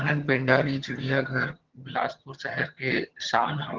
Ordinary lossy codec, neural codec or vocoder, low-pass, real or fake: Opus, 16 kbps; vocoder, 22.05 kHz, 80 mel bands, HiFi-GAN; 7.2 kHz; fake